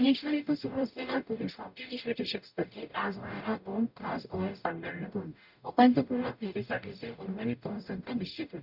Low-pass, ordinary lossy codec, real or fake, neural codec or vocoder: 5.4 kHz; none; fake; codec, 44.1 kHz, 0.9 kbps, DAC